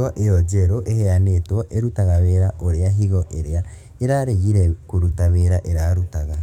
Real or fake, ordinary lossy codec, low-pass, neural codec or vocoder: fake; none; 19.8 kHz; codec, 44.1 kHz, 7.8 kbps, DAC